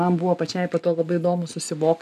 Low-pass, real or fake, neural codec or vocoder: 14.4 kHz; real; none